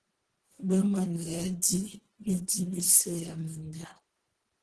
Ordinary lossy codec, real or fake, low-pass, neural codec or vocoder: Opus, 16 kbps; fake; 10.8 kHz; codec, 24 kHz, 1.5 kbps, HILCodec